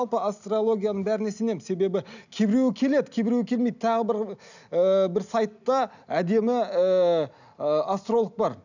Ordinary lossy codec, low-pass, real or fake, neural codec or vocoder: none; 7.2 kHz; real; none